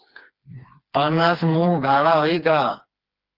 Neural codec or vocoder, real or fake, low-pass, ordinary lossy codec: codec, 16 kHz, 2 kbps, FreqCodec, smaller model; fake; 5.4 kHz; Opus, 32 kbps